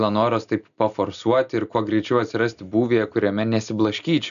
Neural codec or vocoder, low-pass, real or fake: none; 7.2 kHz; real